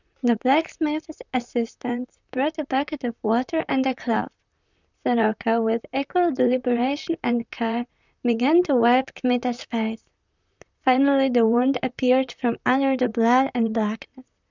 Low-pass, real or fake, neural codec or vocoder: 7.2 kHz; fake; vocoder, 44.1 kHz, 128 mel bands, Pupu-Vocoder